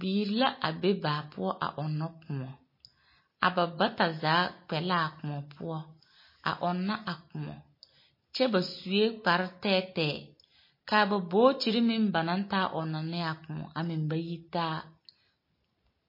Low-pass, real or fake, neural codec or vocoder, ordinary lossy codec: 5.4 kHz; real; none; MP3, 24 kbps